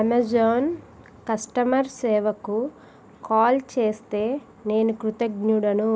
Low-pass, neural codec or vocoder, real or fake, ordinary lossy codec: none; none; real; none